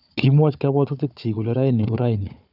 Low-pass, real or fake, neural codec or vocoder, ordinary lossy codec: 5.4 kHz; fake; codec, 16 kHz, 4 kbps, FunCodec, trained on Chinese and English, 50 frames a second; none